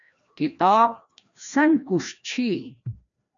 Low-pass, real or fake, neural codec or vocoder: 7.2 kHz; fake; codec, 16 kHz, 1 kbps, FreqCodec, larger model